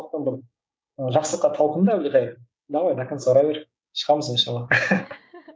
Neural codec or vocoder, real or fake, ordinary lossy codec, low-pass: codec, 16 kHz, 6 kbps, DAC; fake; none; none